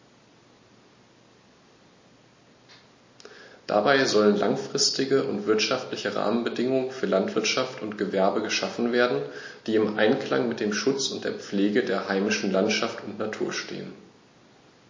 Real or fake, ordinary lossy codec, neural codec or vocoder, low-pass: real; MP3, 32 kbps; none; 7.2 kHz